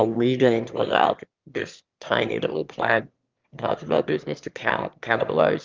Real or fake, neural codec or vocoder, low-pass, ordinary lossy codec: fake; autoencoder, 22.05 kHz, a latent of 192 numbers a frame, VITS, trained on one speaker; 7.2 kHz; Opus, 32 kbps